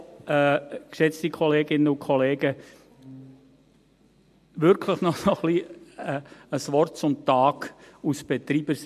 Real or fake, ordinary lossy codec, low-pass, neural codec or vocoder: real; MP3, 64 kbps; 14.4 kHz; none